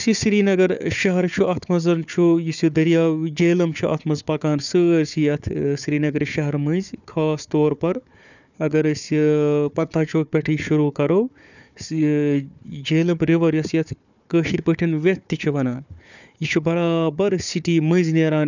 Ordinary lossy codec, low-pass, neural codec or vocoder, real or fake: none; 7.2 kHz; codec, 16 kHz, 4 kbps, FunCodec, trained on Chinese and English, 50 frames a second; fake